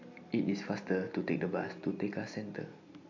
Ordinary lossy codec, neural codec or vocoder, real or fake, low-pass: AAC, 48 kbps; none; real; 7.2 kHz